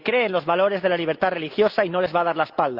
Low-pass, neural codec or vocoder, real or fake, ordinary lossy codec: 5.4 kHz; none; real; Opus, 24 kbps